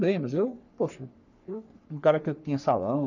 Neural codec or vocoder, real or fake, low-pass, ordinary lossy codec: codec, 44.1 kHz, 3.4 kbps, Pupu-Codec; fake; 7.2 kHz; none